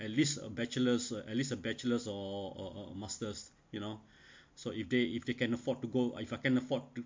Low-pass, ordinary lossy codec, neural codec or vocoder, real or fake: 7.2 kHz; MP3, 48 kbps; none; real